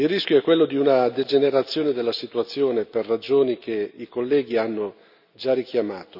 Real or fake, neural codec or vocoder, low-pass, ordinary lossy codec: real; none; 5.4 kHz; none